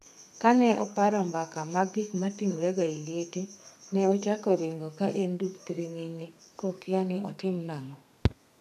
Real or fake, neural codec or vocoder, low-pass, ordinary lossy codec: fake; codec, 32 kHz, 1.9 kbps, SNAC; 14.4 kHz; none